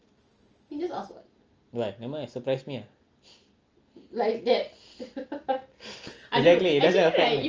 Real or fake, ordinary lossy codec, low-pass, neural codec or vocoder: real; Opus, 24 kbps; 7.2 kHz; none